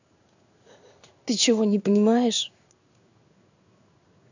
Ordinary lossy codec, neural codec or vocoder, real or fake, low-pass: none; codec, 16 kHz in and 24 kHz out, 1 kbps, XY-Tokenizer; fake; 7.2 kHz